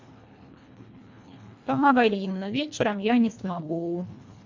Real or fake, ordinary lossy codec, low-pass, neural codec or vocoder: fake; AAC, 48 kbps; 7.2 kHz; codec, 24 kHz, 1.5 kbps, HILCodec